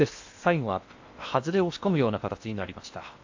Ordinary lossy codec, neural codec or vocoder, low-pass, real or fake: AAC, 48 kbps; codec, 16 kHz in and 24 kHz out, 0.8 kbps, FocalCodec, streaming, 65536 codes; 7.2 kHz; fake